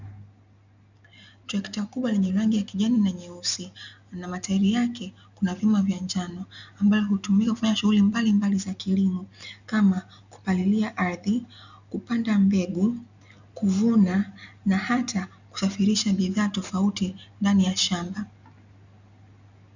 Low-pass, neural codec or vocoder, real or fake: 7.2 kHz; none; real